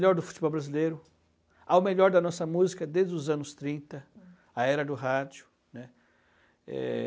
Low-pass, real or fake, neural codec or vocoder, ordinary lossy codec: none; real; none; none